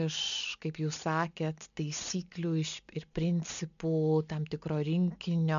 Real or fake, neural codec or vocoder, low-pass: real; none; 7.2 kHz